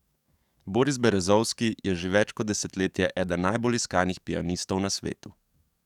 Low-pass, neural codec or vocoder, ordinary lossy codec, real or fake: 19.8 kHz; codec, 44.1 kHz, 7.8 kbps, DAC; none; fake